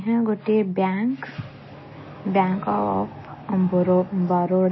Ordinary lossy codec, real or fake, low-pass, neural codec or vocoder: MP3, 24 kbps; real; 7.2 kHz; none